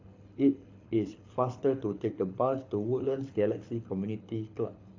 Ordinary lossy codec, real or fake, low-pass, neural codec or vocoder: AAC, 48 kbps; fake; 7.2 kHz; codec, 24 kHz, 6 kbps, HILCodec